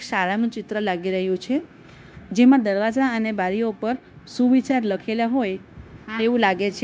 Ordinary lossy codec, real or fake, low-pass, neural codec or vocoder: none; fake; none; codec, 16 kHz, 0.9 kbps, LongCat-Audio-Codec